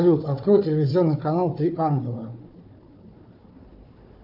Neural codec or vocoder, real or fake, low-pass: codec, 16 kHz, 4 kbps, FunCodec, trained on Chinese and English, 50 frames a second; fake; 5.4 kHz